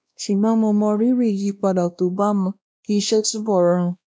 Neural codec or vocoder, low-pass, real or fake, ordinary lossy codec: codec, 16 kHz, 1 kbps, X-Codec, WavLM features, trained on Multilingual LibriSpeech; none; fake; none